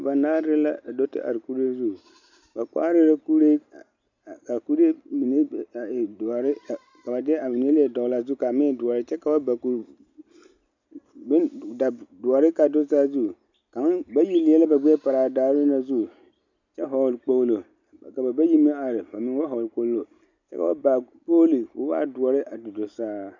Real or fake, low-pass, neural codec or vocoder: real; 7.2 kHz; none